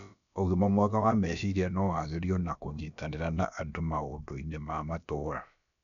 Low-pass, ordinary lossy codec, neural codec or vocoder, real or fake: 7.2 kHz; none; codec, 16 kHz, about 1 kbps, DyCAST, with the encoder's durations; fake